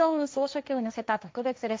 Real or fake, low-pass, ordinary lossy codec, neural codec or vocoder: fake; none; none; codec, 16 kHz, 1.1 kbps, Voila-Tokenizer